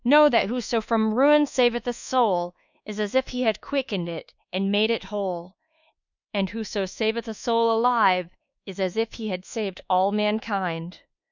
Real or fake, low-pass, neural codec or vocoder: fake; 7.2 kHz; codec, 24 kHz, 1.2 kbps, DualCodec